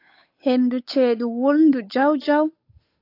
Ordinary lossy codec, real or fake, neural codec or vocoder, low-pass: AAC, 32 kbps; fake; codec, 16 kHz, 8 kbps, FunCodec, trained on Chinese and English, 25 frames a second; 5.4 kHz